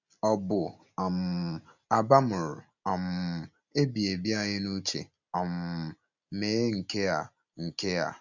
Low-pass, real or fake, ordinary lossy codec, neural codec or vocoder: 7.2 kHz; real; none; none